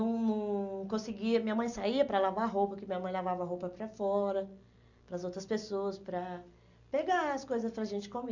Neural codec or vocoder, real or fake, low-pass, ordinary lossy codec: none; real; 7.2 kHz; none